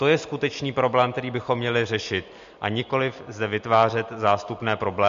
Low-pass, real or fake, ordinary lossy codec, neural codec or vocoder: 7.2 kHz; real; MP3, 48 kbps; none